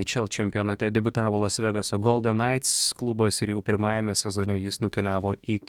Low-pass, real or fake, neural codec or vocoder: 19.8 kHz; fake; codec, 44.1 kHz, 2.6 kbps, DAC